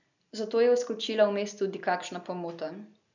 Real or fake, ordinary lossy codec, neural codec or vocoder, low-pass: real; none; none; 7.2 kHz